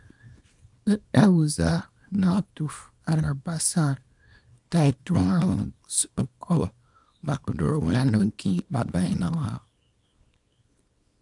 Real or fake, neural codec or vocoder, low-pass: fake; codec, 24 kHz, 0.9 kbps, WavTokenizer, small release; 10.8 kHz